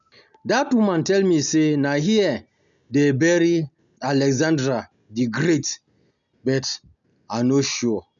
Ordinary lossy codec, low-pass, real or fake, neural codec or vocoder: none; 7.2 kHz; real; none